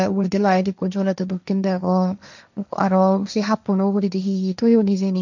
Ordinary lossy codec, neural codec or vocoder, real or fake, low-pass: none; codec, 16 kHz, 1.1 kbps, Voila-Tokenizer; fake; 7.2 kHz